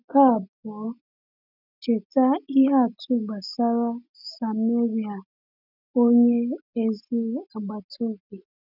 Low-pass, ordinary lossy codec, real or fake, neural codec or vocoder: 5.4 kHz; none; real; none